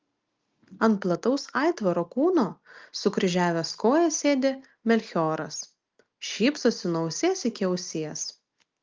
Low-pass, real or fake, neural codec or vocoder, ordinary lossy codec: 7.2 kHz; real; none; Opus, 24 kbps